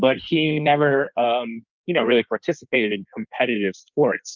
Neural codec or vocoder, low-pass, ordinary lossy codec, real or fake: codec, 16 kHz in and 24 kHz out, 1.1 kbps, FireRedTTS-2 codec; 7.2 kHz; Opus, 24 kbps; fake